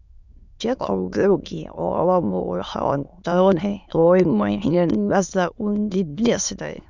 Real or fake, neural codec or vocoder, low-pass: fake; autoencoder, 22.05 kHz, a latent of 192 numbers a frame, VITS, trained on many speakers; 7.2 kHz